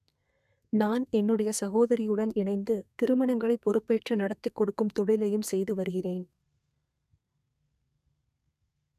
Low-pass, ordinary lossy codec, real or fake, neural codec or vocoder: 14.4 kHz; none; fake; codec, 32 kHz, 1.9 kbps, SNAC